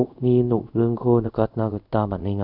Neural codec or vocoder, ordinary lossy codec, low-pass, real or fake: codec, 24 kHz, 0.5 kbps, DualCodec; none; 5.4 kHz; fake